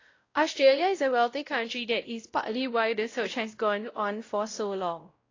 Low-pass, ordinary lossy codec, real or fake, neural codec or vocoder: 7.2 kHz; AAC, 32 kbps; fake; codec, 16 kHz, 0.5 kbps, X-Codec, WavLM features, trained on Multilingual LibriSpeech